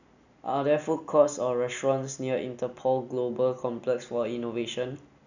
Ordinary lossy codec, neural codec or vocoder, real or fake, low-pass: none; none; real; 7.2 kHz